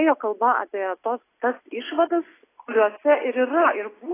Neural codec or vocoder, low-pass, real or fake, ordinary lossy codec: none; 3.6 kHz; real; AAC, 16 kbps